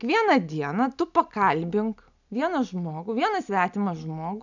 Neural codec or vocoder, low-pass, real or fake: none; 7.2 kHz; real